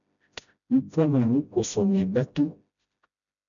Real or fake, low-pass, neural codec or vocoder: fake; 7.2 kHz; codec, 16 kHz, 0.5 kbps, FreqCodec, smaller model